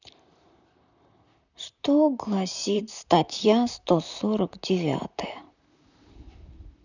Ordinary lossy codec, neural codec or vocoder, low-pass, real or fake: none; vocoder, 44.1 kHz, 128 mel bands, Pupu-Vocoder; 7.2 kHz; fake